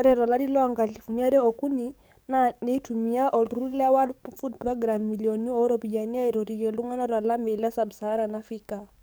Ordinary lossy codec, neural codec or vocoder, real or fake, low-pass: none; codec, 44.1 kHz, 7.8 kbps, DAC; fake; none